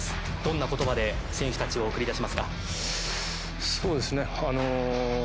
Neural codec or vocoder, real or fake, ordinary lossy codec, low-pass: none; real; none; none